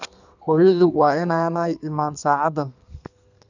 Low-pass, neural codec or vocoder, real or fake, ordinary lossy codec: 7.2 kHz; codec, 32 kHz, 1.9 kbps, SNAC; fake; none